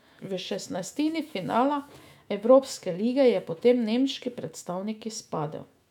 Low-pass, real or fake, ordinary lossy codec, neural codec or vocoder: 19.8 kHz; fake; none; autoencoder, 48 kHz, 128 numbers a frame, DAC-VAE, trained on Japanese speech